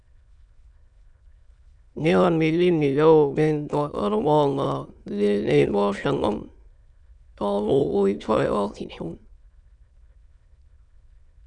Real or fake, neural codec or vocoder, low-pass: fake; autoencoder, 22.05 kHz, a latent of 192 numbers a frame, VITS, trained on many speakers; 9.9 kHz